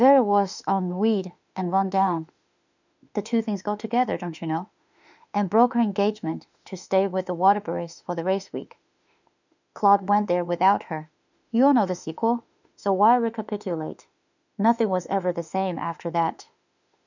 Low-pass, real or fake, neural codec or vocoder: 7.2 kHz; fake; autoencoder, 48 kHz, 32 numbers a frame, DAC-VAE, trained on Japanese speech